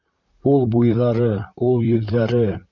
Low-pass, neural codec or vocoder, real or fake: 7.2 kHz; vocoder, 44.1 kHz, 128 mel bands, Pupu-Vocoder; fake